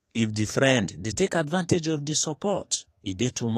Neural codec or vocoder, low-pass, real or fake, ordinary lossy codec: codec, 32 kHz, 1.9 kbps, SNAC; 14.4 kHz; fake; AAC, 48 kbps